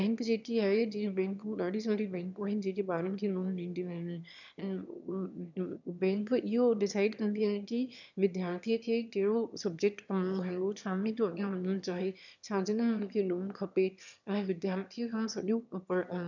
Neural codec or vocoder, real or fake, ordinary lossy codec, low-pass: autoencoder, 22.05 kHz, a latent of 192 numbers a frame, VITS, trained on one speaker; fake; none; 7.2 kHz